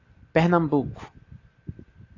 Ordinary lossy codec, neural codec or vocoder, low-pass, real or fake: AAC, 32 kbps; none; 7.2 kHz; real